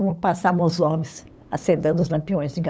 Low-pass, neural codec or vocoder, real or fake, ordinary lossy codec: none; codec, 16 kHz, 8 kbps, FunCodec, trained on LibriTTS, 25 frames a second; fake; none